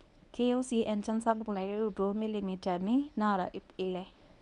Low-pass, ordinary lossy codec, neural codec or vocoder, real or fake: 10.8 kHz; none; codec, 24 kHz, 0.9 kbps, WavTokenizer, medium speech release version 1; fake